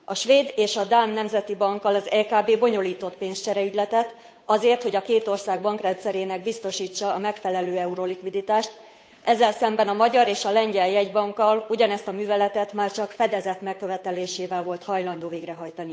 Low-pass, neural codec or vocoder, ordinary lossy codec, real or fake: none; codec, 16 kHz, 8 kbps, FunCodec, trained on Chinese and English, 25 frames a second; none; fake